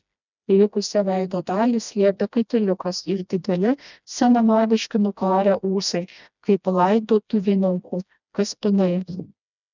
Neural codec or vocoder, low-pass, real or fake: codec, 16 kHz, 1 kbps, FreqCodec, smaller model; 7.2 kHz; fake